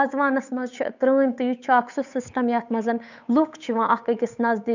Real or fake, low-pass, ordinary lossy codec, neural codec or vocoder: fake; 7.2 kHz; none; codec, 16 kHz, 8 kbps, FunCodec, trained on Chinese and English, 25 frames a second